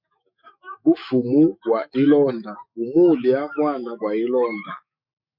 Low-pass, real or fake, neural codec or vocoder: 5.4 kHz; fake; autoencoder, 48 kHz, 128 numbers a frame, DAC-VAE, trained on Japanese speech